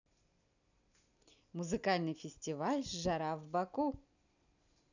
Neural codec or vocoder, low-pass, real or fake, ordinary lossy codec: none; 7.2 kHz; real; none